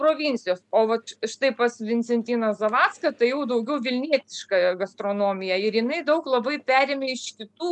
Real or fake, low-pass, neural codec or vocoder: real; 10.8 kHz; none